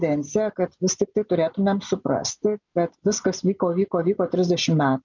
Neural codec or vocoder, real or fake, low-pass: none; real; 7.2 kHz